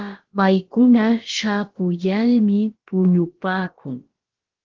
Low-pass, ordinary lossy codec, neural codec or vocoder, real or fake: 7.2 kHz; Opus, 16 kbps; codec, 16 kHz, about 1 kbps, DyCAST, with the encoder's durations; fake